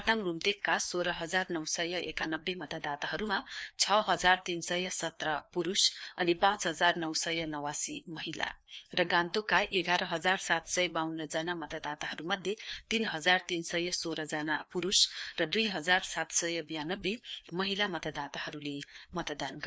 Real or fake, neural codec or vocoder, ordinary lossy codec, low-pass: fake; codec, 16 kHz, 2 kbps, FreqCodec, larger model; none; none